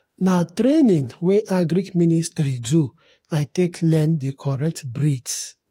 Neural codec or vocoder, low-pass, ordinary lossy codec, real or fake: autoencoder, 48 kHz, 32 numbers a frame, DAC-VAE, trained on Japanese speech; 19.8 kHz; AAC, 48 kbps; fake